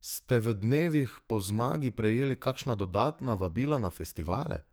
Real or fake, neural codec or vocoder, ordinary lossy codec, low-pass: fake; codec, 44.1 kHz, 2.6 kbps, SNAC; none; none